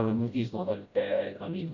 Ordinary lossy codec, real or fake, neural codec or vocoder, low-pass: none; fake; codec, 16 kHz, 0.5 kbps, FreqCodec, smaller model; 7.2 kHz